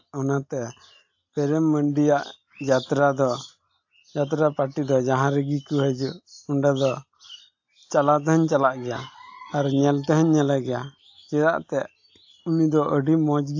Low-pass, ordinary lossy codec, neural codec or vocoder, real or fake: 7.2 kHz; AAC, 48 kbps; none; real